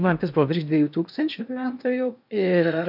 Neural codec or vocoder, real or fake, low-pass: codec, 16 kHz in and 24 kHz out, 0.8 kbps, FocalCodec, streaming, 65536 codes; fake; 5.4 kHz